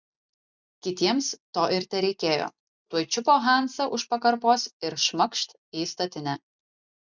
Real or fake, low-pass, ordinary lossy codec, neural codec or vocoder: real; 7.2 kHz; Opus, 64 kbps; none